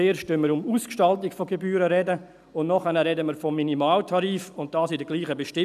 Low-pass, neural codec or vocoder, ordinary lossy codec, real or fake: 14.4 kHz; none; none; real